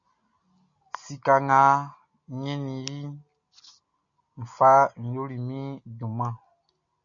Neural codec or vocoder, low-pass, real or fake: none; 7.2 kHz; real